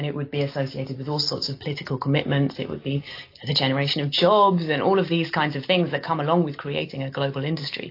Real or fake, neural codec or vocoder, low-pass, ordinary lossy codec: real; none; 5.4 kHz; AAC, 32 kbps